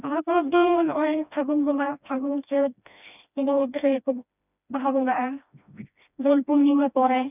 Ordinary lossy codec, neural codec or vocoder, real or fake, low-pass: none; codec, 16 kHz, 1 kbps, FreqCodec, smaller model; fake; 3.6 kHz